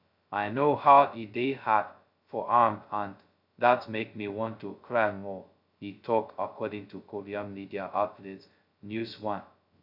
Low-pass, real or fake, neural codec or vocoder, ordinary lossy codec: 5.4 kHz; fake; codec, 16 kHz, 0.2 kbps, FocalCodec; none